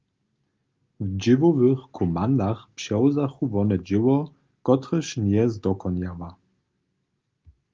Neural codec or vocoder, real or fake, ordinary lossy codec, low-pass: none; real; Opus, 32 kbps; 7.2 kHz